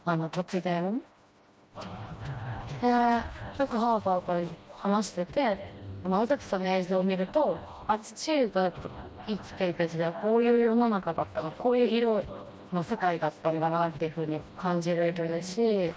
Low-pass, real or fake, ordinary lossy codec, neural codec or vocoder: none; fake; none; codec, 16 kHz, 1 kbps, FreqCodec, smaller model